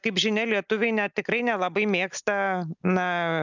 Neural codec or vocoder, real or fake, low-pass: none; real; 7.2 kHz